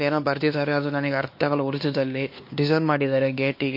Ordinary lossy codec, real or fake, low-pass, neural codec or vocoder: MP3, 32 kbps; fake; 5.4 kHz; codec, 16 kHz, 2 kbps, X-Codec, WavLM features, trained on Multilingual LibriSpeech